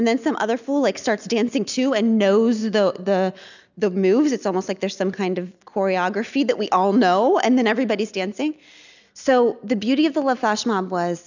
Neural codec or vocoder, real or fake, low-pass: none; real; 7.2 kHz